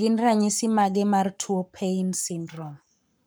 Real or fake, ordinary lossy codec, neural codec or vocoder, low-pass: fake; none; codec, 44.1 kHz, 7.8 kbps, Pupu-Codec; none